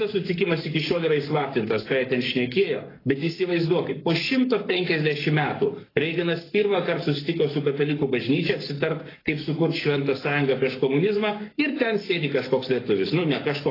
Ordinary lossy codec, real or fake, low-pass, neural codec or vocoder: AAC, 24 kbps; fake; 5.4 kHz; codec, 44.1 kHz, 7.8 kbps, Pupu-Codec